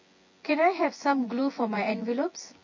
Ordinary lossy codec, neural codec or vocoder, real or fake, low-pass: MP3, 32 kbps; vocoder, 24 kHz, 100 mel bands, Vocos; fake; 7.2 kHz